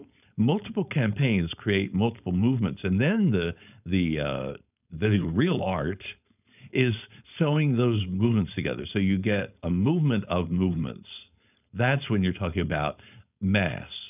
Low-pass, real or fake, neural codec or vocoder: 3.6 kHz; fake; codec, 16 kHz, 4.8 kbps, FACodec